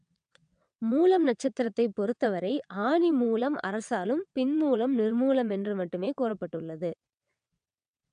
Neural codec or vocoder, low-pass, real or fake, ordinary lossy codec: vocoder, 22.05 kHz, 80 mel bands, WaveNeXt; 9.9 kHz; fake; none